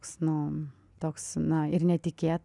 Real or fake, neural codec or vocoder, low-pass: real; none; 10.8 kHz